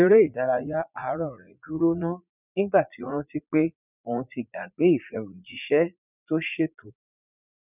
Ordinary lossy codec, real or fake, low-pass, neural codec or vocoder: none; fake; 3.6 kHz; vocoder, 44.1 kHz, 80 mel bands, Vocos